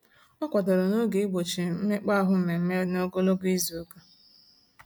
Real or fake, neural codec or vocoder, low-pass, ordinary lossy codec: real; none; none; none